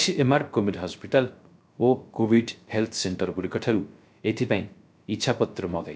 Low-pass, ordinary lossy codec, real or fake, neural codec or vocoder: none; none; fake; codec, 16 kHz, 0.3 kbps, FocalCodec